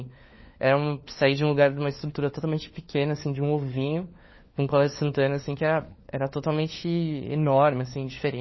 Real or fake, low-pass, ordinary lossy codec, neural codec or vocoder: fake; 7.2 kHz; MP3, 24 kbps; codec, 16 kHz, 4 kbps, FunCodec, trained on LibriTTS, 50 frames a second